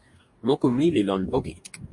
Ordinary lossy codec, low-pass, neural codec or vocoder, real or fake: MP3, 48 kbps; 10.8 kHz; codec, 44.1 kHz, 2.6 kbps, DAC; fake